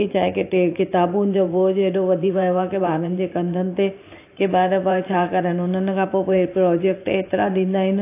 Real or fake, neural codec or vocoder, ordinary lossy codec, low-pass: fake; vocoder, 44.1 kHz, 128 mel bands every 512 samples, BigVGAN v2; AAC, 24 kbps; 3.6 kHz